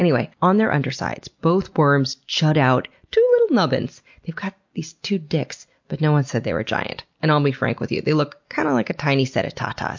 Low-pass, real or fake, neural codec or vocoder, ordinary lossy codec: 7.2 kHz; real; none; MP3, 48 kbps